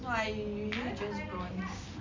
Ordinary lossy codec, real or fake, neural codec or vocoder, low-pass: MP3, 64 kbps; real; none; 7.2 kHz